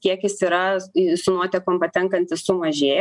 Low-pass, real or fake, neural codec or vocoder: 10.8 kHz; real; none